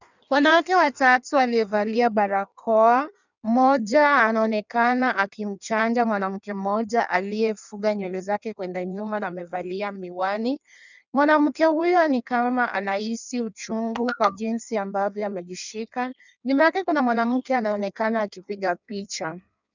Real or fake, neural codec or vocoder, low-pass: fake; codec, 16 kHz in and 24 kHz out, 1.1 kbps, FireRedTTS-2 codec; 7.2 kHz